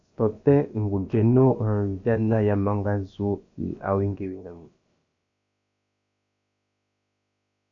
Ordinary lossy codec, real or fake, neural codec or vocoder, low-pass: AAC, 64 kbps; fake; codec, 16 kHz, about 1 kbps, DyCAST, with the encoder's durations; 7.2 kHz